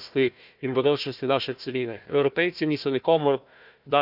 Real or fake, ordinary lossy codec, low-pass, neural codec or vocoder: fake; none; 5.4 kHz; codec, 16 kHz, 1 kbps, FunCodec, trained on Chinese and English, 50 frames a second